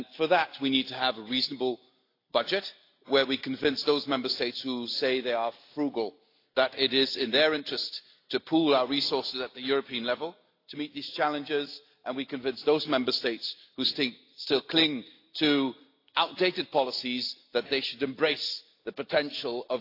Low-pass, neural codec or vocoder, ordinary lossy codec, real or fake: 5.4 kHz; none; AAC, 32 kbps; real